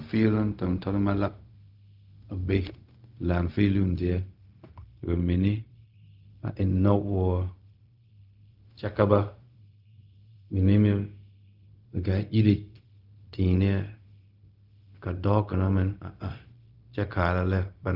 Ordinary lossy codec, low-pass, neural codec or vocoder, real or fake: Opus, 32 kbps; 5.4 kHz; codec, 16 kHz, 0.4 kbps, LongCat-Audio-Codec; fake